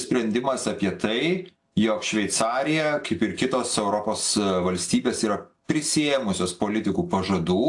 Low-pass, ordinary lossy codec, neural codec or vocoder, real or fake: 10.8 kHz; AAC, 64 kbps; none; real